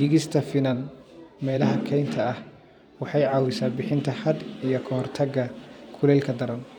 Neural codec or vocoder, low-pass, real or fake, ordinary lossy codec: vocoder, 44.1 kHz, 128 mel bands every 512 samples, BigVGAN v2; 19.8 kHz; fake; none